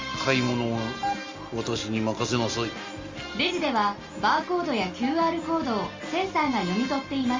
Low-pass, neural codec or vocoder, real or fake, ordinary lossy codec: 7.2 kHz; none; real; Opus, 32 kbps